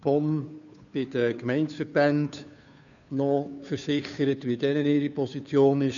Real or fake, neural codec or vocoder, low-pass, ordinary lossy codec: fake; codec, 16 kHz, 2 kbps, FunCodec, trained on Chinese and English, 25 frames a second; 7.2 kHz; none